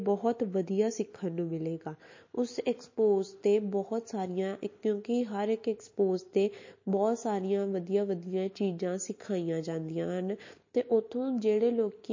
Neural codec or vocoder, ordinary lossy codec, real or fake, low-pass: none; MP3, 32 kbps; real; 7.2 kHz